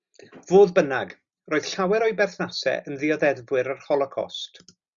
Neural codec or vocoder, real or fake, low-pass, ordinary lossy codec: none; real; 7.2 kHz; Opus, 64 kbps